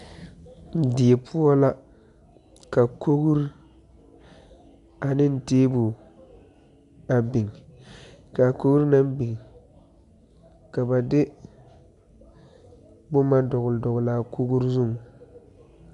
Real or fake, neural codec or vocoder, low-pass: real; none; 10.8 kHz